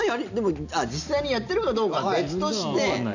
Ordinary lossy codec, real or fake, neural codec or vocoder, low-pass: none; real; none; 7.2 kHz